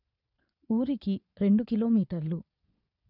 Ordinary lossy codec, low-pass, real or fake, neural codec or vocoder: none; 5.4 kHz; fake; vocoder, 22.05 kHz, 80 mel bands, Vocos